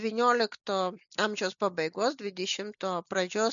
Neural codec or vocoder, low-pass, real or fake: none; 7.2 kHz; real